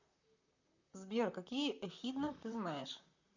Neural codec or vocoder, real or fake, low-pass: vocoder, 44.1 kHz, 128 mel bands, Pupu-Vocoder; fake; 7.2 kHz